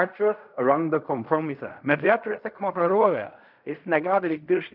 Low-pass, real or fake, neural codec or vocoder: 5.4 kHz; fake; codec, 16 kHz in and 24 kHz out, 0.4 kbps, LongCat-Audio-Codec, fine tuned four codebook decoder